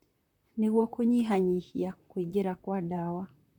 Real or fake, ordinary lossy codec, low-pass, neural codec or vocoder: fake; none; 19.8 kHz; vocoder, 44.1 kHz, 128 mel bands, Pupu-Vocoder